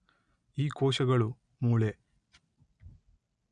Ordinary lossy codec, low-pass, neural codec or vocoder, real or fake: none; 9.9 kHz; none; real